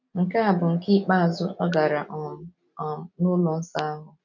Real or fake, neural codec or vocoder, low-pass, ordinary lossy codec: real; none; 7.2 kHz; none